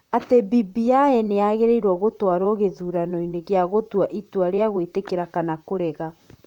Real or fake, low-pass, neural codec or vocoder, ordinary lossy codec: fake; 19.8 kHz; vocoder, 44.1 kHz, 128 mel bands, Pupu-Vocoder; none